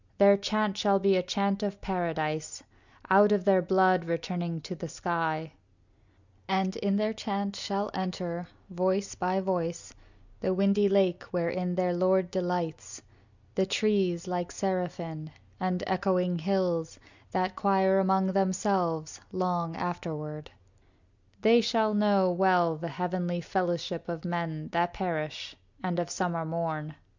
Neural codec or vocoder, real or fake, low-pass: none; real; 7.2 kHz